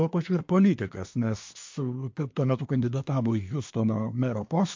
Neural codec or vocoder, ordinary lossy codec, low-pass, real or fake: codec, 24 kHz, 1 kbps, SNAC; MP3, 48 kbps; 7.2 kHz; fake